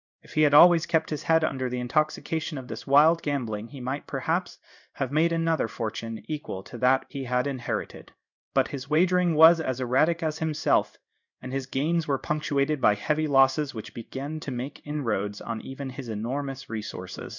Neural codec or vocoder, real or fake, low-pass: codec, 16 kHz in and 24 kHz out, 1 kbps, XY-Tokenizer; fake; 7.2 kHz